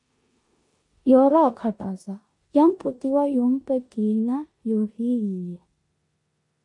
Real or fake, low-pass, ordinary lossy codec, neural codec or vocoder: fake; 10.8 kHz; MP3, 48 kbps; codec, 16 kHz in and 24 kHz out, 0.9 kbps, LongCat-Audio-Codec, four codebook decoder